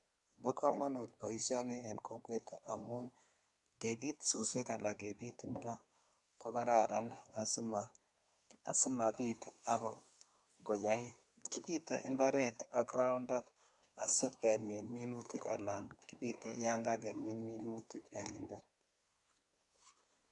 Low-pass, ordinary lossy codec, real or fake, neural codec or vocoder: 10.8 kHz; none; fake; codec, 24 kHz, 1 kbps, SNAC